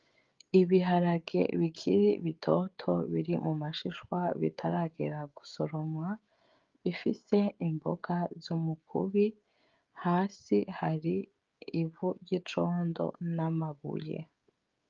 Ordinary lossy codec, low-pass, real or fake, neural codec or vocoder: Opus, 32 kbps; 7.2 kHz; fake; codec, 16 kHz, 16 kbps, FreqCodec, smaller model